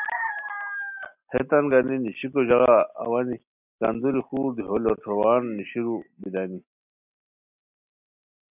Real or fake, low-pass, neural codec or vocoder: real; 3.6 kHz; none